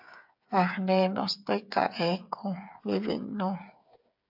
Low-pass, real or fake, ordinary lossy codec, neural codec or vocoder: 5.4 kHz; fake; AAC, 48 kbps; codec, 16 kHz, 8 kbps, FreqCodec, smaller model